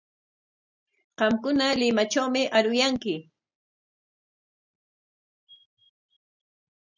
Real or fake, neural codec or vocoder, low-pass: real; none; 7.2 kHz